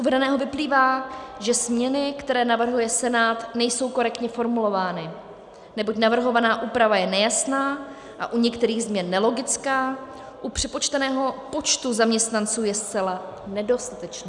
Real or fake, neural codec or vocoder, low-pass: real; none; 10.8 kHz